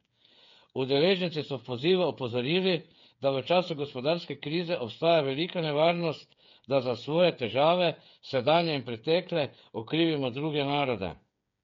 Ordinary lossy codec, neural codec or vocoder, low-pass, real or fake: MP3, 48 kbps; codec, 16 kHz, 8 kbps, FreqCodec, smaller model; 7.2 kHz; fake